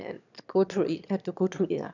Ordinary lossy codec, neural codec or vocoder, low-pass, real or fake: none; autoencoder, 22.05 kHz, a latent of 192 numbers a frame, VITS, trained on one speaker; 7.2 kHz; fake